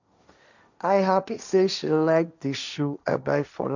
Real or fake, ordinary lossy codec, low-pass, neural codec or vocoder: fake; none; 7.2 kHz; codec, 16 kHz, 1.1 kbps, Voila-Tokenizer